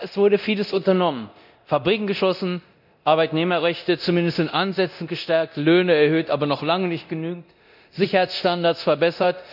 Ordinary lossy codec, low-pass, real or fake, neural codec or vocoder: none; 5.4 kHz; fake; codec, 24 kHz, 0.9 kbps, DualCodec